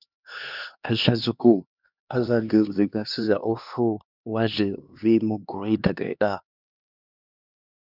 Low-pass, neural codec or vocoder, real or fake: 5.4 kHz; codec, 16 kHz, 2 kbps, X-Codec, HuBERT features, trained on LibriSpeech; fake